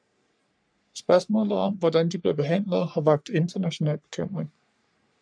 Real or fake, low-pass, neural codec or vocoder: fake; 9.9 kHz; codec, 44.1 kHz, 3.4 kbps, Pupu-Codec